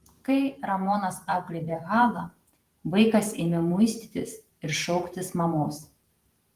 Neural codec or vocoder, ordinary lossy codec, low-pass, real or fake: vocoder, 48 kHz, 128 mel bands, Vocos; Opus, 24 kbps; 14.4 kHz; fake